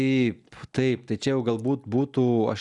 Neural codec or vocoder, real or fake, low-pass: none; real; 10.8 kHz